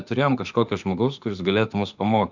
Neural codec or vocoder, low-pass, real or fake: autoencoder, 48 kHz, 32 numbers a frame, DAC-VAE, trained on Japanese speech; 7.2 kHz; fake